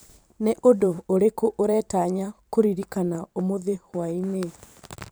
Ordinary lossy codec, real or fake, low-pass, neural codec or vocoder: none; fake; none; vocoder, 44.1 kHz, 128 mel bands every 512 samples, BigVGAN v2